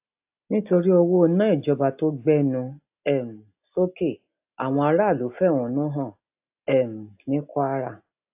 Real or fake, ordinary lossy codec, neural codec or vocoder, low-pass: real; AAC, 32 kbps; none; 3.6 kHz